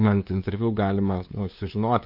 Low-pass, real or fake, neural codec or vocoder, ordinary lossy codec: 5.4 kHz; fake; codec, 16 kHz, 2 kbps, FunCodec, trained on LibriTTS, 25 frames a second; AAC, 48 kbps